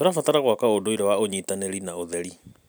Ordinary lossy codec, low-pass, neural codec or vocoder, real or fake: none; none; none; real